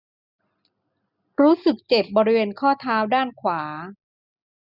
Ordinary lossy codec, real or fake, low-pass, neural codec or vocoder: none; real; 5.4 kHz; none